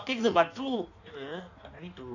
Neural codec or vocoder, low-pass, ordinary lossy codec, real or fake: codec, 16 kHz in and 24 kHz out, 1.1 kbps, FireRedTTS-2 codec; 7.2 kHz; none; fake